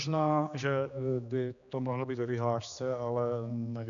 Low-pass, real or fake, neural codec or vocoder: 7.2 kHz; fake; codec, 16 kHz, 2 kbps, X-Codec, HuBERT features, trained on general audio